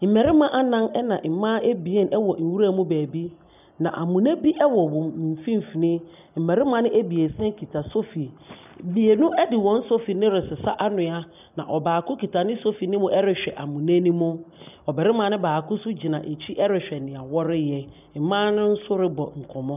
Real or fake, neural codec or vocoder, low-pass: real; none; 3.6 kHz